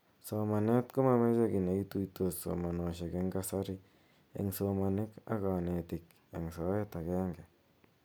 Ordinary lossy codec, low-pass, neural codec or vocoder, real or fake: none; none; none; real